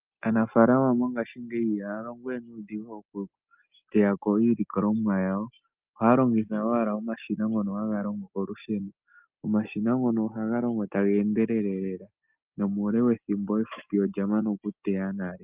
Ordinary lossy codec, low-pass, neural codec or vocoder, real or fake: Opus, 24 kbps; 3.6 kHz; none; real